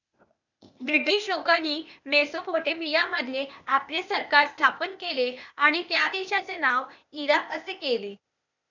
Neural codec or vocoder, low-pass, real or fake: codec, 16 kHz, 0.8 kbps, ZipCodec; 7.2 kHz; fake